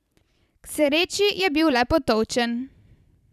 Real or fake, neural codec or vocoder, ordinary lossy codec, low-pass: fake; vocoder, 44.1 kHz, 128 mel bands every 512 samples, BigVGAN v2; none; 14.4 kHz